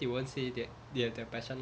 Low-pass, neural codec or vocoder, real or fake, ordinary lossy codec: none; none; real; none